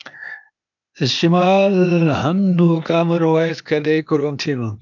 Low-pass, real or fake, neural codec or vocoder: 7.2 kHz; fake; codec, 16 kHz, 0.8 kbps, ZipCodec